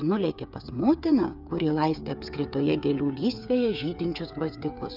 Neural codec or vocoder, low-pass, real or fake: codec, 16 kHz, 8 kbps, FreqCodec, smaller model; 5.4 kHz; fake